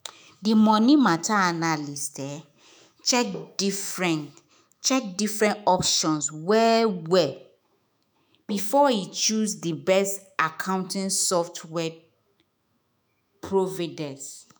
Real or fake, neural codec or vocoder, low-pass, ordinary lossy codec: fake; autoencoder, 48 kHz, 128 numbers a frame, DAC-VAE, trained on Japanese speech; none; none